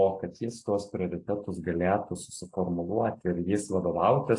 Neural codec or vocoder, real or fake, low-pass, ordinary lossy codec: none; real; 10.8 kHz; AAC, 48 kbps